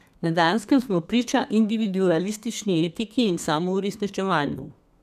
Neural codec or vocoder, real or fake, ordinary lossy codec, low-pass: codec, 32 kHz, 1.9 kbps, SNAC; fake; none; 14.4 kHz